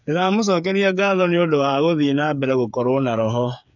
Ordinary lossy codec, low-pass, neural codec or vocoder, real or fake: none; 7.2 kHz; codec, 16 kHz, 8 kbps, FreqCodec, smaller model; fake